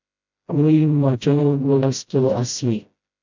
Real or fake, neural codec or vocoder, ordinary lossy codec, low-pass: fake; codec, 16 kHz, 0.5 kbps, FreqCodec, smaller model; MP3, 64 kbps; 7.2 kHz